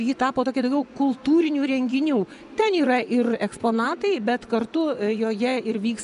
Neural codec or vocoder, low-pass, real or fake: vocoder, 24 kHz, 100 mel bands, Vocos; 10.8 kHz; fake